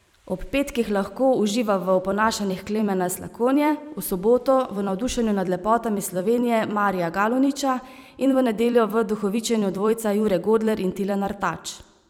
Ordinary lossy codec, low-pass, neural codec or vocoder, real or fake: none; 19.8 kHz; vocoder, 44.1 kHz, 128 mel bands every 256 samples, BigVGAN v2; fake